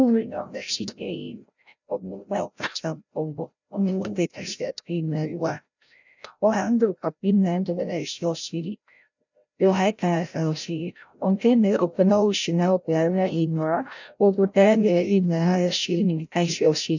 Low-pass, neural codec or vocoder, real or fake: 7.2 kHz; codec, 16 kHz, 0.5 kbps, FreqCodec, larger model; fake